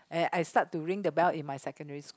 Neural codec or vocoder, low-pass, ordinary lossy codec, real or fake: none; none; none; real